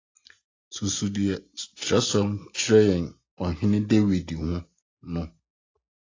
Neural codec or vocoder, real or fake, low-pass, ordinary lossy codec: none; real; 7.2 kHz; AAC, 32 kbps